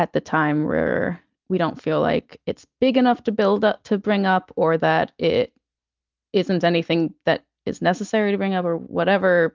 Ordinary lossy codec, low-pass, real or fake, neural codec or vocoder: Opus, 24 kbps; 7.2 kHz; real; none